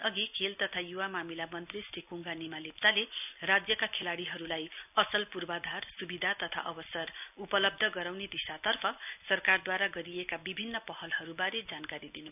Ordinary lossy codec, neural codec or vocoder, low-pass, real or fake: none; none; 3.6 kHz; real